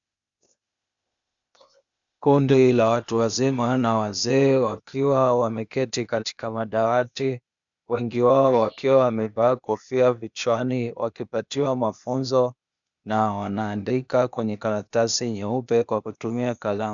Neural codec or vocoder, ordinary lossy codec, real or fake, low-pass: codec, 16 kHz, 0.8 kbps, ZipCodec; MP3, 96 kbps; fake; 7.2 kHz